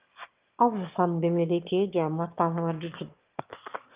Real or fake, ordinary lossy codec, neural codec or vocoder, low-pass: fake; Opus, 32 kbps; autoencoder, 22.05 kHz, a latent of 192 numbers a frame, VITS, trained on one speaker; 3.6 kHz